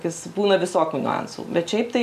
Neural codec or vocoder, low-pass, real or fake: none; 14.4 kHz; real